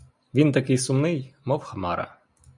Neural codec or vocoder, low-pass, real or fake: none; 10.8 kHz; real